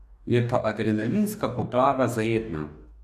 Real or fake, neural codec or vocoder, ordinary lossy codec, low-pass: fake; codec, 44.1 kHz, 2.6 kbps, DAC; none; 14.4 kHz